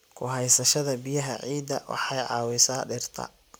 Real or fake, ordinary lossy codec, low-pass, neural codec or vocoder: real; none; none; none